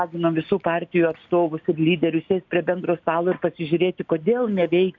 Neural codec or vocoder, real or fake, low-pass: none; real; 7.2 kHz